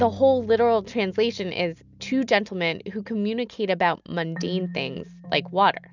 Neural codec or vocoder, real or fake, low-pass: none; real; 7.2 kHz